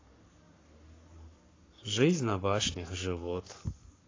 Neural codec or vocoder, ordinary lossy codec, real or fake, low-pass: codec, 44.1 kHz, 7.8 kbps, Pupu-Codec; AAC, 32 kbps; fake; 7.2 kHz